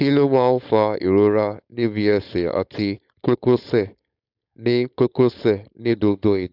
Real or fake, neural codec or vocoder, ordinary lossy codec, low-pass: fake; codec, 16 kHz, 4.8 kbps, FACodec; AAC, 48 kbps; 5.4 kHz